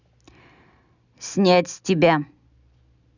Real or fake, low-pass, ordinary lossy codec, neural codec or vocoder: real; 7.2 kHz; none; none